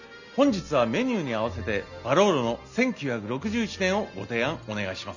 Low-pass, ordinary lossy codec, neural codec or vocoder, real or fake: 7.2 kHz; none; none; real